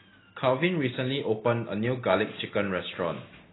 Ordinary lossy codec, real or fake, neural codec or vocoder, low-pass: AAC, 16 kbps; real; none; 7.2 kHz